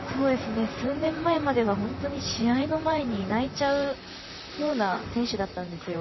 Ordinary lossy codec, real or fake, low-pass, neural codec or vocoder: MP3, 24 kbps; fake; 7.2 kHz; vocoder, 44.1 kHz, 128 mel bands, Pupu-Vocoder